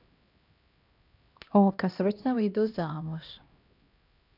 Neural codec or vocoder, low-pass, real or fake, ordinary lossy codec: codec, 16 kHz, 1 kbps, X-Codec, HuBERT features, trained on LibriSpeech; 5.4 kHz; fake; none